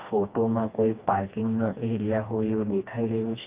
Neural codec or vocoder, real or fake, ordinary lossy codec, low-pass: codec, 16 kHz, 2 kbps, FreqCodec, smaller model; fake; Opus, 32 kbps; 3.6 kHz